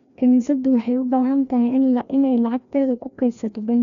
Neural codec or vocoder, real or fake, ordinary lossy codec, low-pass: codec, 16 kHz, 1 kbps, FreqCodec, larger model; fake; none; 7.2 kHz